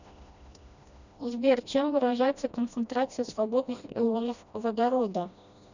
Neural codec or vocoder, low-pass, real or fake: codec, 16 kHz, 1 kbps, FreqCodec, smaller model; 7.2 kHz; fake